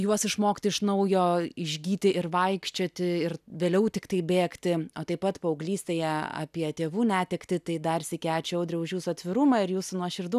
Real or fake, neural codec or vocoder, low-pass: real; none; 14.4 kHz